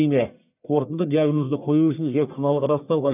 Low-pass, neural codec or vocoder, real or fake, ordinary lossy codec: 3.6 kHz; codec, 44.1 kHz, 1.7 kbps, Pupu-Codec; fake; none